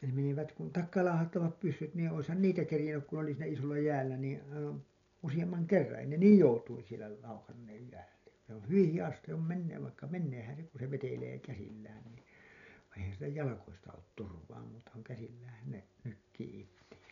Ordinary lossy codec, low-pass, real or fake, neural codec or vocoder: none; 7.2 kHz; real; none